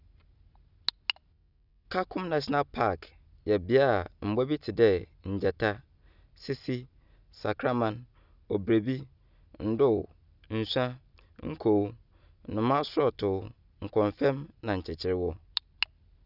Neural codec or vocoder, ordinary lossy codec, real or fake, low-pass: none; none; real; 5.4 kHz